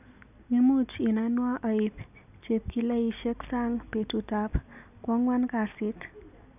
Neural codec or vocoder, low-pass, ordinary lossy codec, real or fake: none; 3.6 kHz; none; real